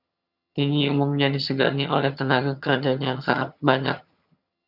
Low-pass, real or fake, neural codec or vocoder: 5.4 kHz; fake; vocoder, 22.05 kHz, 80 mel bands, HiFi-GAN